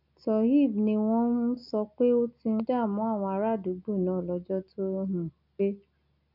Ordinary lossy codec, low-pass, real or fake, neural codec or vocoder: none; 5.4 kHz; real; none